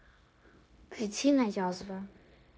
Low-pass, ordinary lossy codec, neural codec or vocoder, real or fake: none; none; codec, 16 kHz, 0.9 kbps, LongCat-Audio-Codec; fake